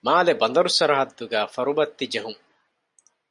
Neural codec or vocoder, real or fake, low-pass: none; real; 10.8 kHz